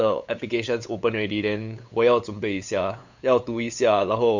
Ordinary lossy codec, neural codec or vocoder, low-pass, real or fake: Opus, 64 kbps; codec, 16 kHz, 8 kbps, FunCodec, trained on LibriTTS, 25 frames a second; 7.2 kHz; fake